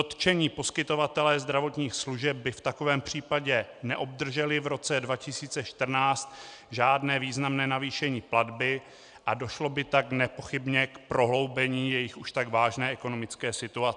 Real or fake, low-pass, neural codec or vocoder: real; 9.9 kHz; none